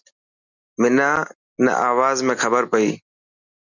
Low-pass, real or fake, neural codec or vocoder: 7.2 kHz; real; none